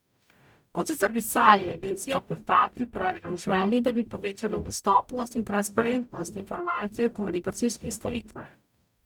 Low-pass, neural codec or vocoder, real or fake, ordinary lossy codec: 19.8 kHz; codec, 44.1 kHz, 0.9 kbps, DAC; fake; none